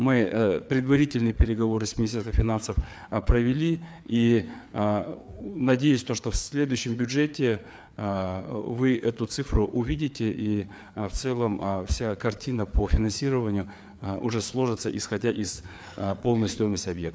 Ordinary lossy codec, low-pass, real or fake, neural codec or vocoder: none; none; fake; codec, 16 kHz, 4 kbps, FreqCodec, larger model